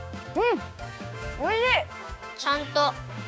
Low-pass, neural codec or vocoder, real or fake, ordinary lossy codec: none; codec, 16 kHz, 6 kbps, DAC; fake; none